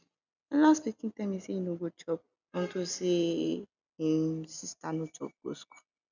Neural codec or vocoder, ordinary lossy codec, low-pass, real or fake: none; none; 7.2 kHz; real